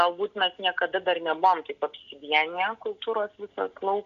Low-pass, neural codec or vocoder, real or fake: 7.2 kHz; none; real